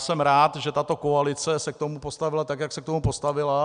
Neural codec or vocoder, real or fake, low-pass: none; real; 9.9 kHz